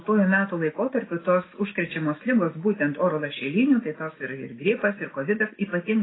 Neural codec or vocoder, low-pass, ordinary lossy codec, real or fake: none; 7.2 kHz; AAC, 16 kbps; real